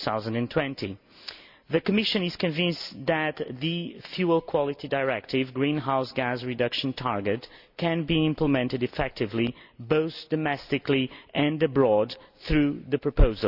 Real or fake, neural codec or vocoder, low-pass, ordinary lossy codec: real; none; 5.4 kHz; none